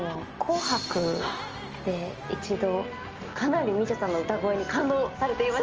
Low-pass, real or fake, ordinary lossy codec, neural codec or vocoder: 7.2 kHz; real; Opus, 24 kbps; none